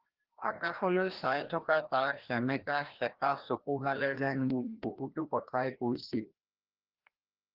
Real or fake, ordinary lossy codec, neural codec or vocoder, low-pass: fake; Opus, 16 kbps; codec, 16 kHz, 1 kbps, FreqCodec, larger model; 5.4 kHz